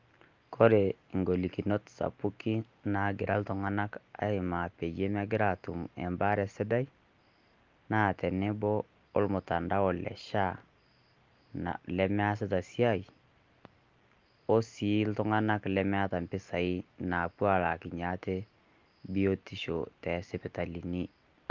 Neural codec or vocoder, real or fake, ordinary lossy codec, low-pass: none; real; Opus, 32 kbps; 7.2 kHz